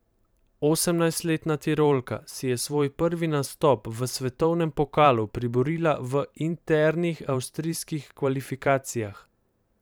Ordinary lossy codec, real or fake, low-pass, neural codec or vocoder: none; fake; none; vocoder, 44.1 kHz, 128 mel bands every 512 samples, BigVGAN v2